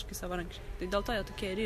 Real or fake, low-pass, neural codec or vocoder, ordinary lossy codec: real; 14.4 kHz; none; MP3, 64 kbps